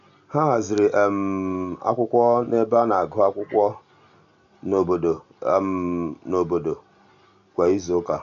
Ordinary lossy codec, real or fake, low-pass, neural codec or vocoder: AAC, 64 kbps; real; 7.2 kHz; none